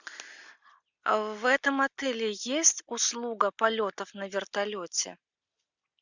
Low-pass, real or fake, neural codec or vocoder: 7.2 kHz; real; none